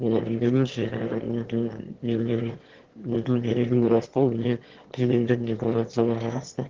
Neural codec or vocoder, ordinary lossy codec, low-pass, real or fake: autoencoder, 22.05 kHz, a latent of 192 numbers a frame, VITS, trained on one speaker; Opus, 16 kbps; 7.2 kHz; fake